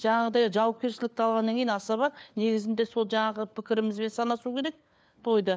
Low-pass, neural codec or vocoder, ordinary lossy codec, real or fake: none; codec, 16 kHz, 4 kbps, FunCodec, trained on LibriTTS, 50 frames a second; none; fake